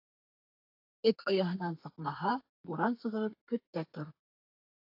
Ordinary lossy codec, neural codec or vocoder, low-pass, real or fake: AAC, 32 kbps; codec, 32 kHz, 1.9 kbps, SNAC; 5.4 kHz; fake